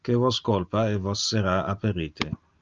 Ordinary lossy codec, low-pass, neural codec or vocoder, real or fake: Opus, 24 kbps; 7.2 kHz; none; real